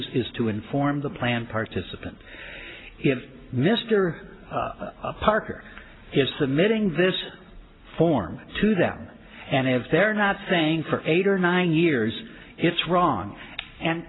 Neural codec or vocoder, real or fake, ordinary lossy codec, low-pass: none; real; AAC, 16 kbps; 7.2 kHz